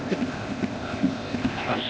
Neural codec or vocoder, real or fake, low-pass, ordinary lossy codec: codec, 16 kHz, 0.8 kbps, ZipCodec; fake; none; none